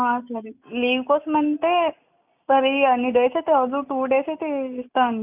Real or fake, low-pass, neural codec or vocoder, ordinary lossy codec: real; 3.6 kHz; none; none